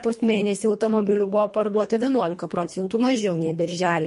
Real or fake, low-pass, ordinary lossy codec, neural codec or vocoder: fake; 10.8 kHz; MP3, 48 kbps; codec, 24 kHz, 1.5 kbps, HILCodec